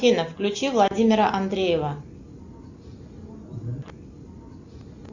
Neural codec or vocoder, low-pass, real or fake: none; 7.2 kHz; real